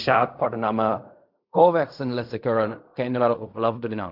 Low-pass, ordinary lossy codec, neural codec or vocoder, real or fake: 5.4 kHz; none; codec, 16 kHz in and 24 kHz out, 0.4 kbps, LongCat-Audio-Codec, fine tuned four codebook decoder; fake